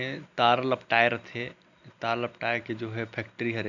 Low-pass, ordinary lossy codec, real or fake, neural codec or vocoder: 7.2 kHz; none; real; none